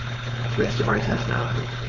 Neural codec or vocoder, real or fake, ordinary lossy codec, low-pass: codec, 16 kHz, 4.8 kbps, FACodec; fake; none; 7.2 kHz